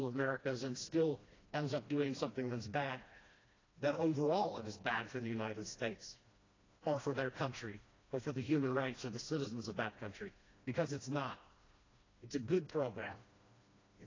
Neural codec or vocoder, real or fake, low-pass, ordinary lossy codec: codec, 16 kHz, 1 kbps, FreqCodec, smaller model; fake; 7.2 kHz; AAC, 32 kbps